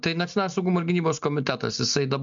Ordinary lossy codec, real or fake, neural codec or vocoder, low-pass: MP3, 64 kbps; real; none; 7.2 kHz